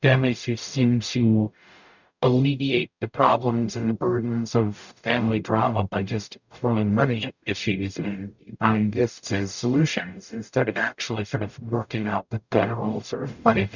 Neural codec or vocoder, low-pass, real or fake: codec, 44.1 kHz, 0.9 kbps, DAC; 7.2 kHz; fake